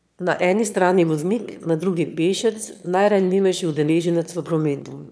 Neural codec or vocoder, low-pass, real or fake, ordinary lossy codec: autoencoder, 22.05 kHz, a latent of 192 numbers a frame, VITS, trained on one speaker; none; fake; none